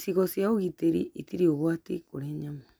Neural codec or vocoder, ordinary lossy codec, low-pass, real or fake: none; none; none; real